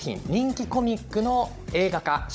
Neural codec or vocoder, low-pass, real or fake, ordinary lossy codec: codec, 16 kHz, 16 kbps, FunCodec, trained on Chinese and English, 50 frames a second; none; fake; none